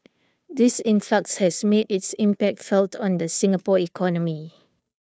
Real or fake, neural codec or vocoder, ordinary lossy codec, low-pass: fake; codec, 16 kHz, 2 kbps, FunCodec, trained on Chinese and English, 25 frames a second; none; none